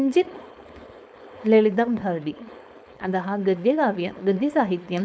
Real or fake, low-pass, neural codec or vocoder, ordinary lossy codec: fake; none; codec, 16 kHz, 4.8 kbps, FACodec; none